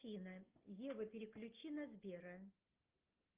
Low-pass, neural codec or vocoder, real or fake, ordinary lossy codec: 3.6 kHz; none; real; Opus, 32 kbps